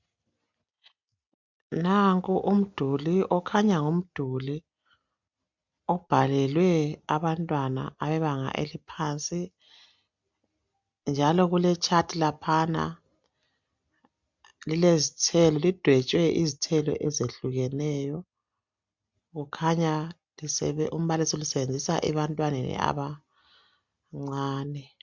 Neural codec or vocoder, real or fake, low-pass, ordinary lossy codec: none; real; 7.2 kHz; MP3, 64 kbps